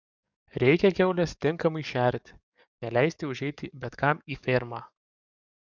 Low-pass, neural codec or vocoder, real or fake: 7.2 kHz; codec, 44.1 kHz, 7.8 kbps, DAC; fake